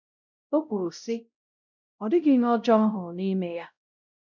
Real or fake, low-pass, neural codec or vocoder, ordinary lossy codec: fake; 7.2 kHz; codec, 16 kHz, 0.5 kbps, X-Codec, WavLM features, trained on Multilingual LibriSpeech; none